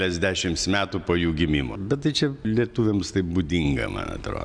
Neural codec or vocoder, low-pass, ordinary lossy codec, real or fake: none; 9.9 kHz; Opus, 64 kbps; real